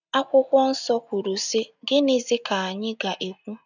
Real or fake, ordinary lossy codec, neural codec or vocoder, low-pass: real; none; none; 7.2 kHz